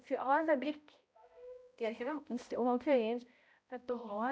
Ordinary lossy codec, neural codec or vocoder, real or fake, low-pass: none; codec, 16 kHz, 0.5 kbps, X-Codec, HuBERT features, trained on balanced general audio; fake; none